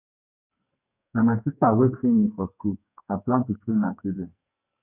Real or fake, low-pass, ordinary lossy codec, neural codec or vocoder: fake; 3.6 kHz; none; codec, 44.1 kHz, 2.6 kbps, SNAC